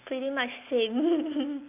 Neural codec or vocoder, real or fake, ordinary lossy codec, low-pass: none; real; AAC, 32 kbps; 3.6 kHz